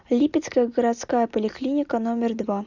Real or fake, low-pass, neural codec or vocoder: real; 7.2 kHz; none